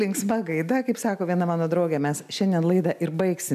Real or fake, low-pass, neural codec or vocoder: real; 14.4 kHz; none